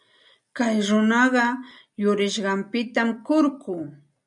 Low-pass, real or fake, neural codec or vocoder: 10.8 kHz; real; none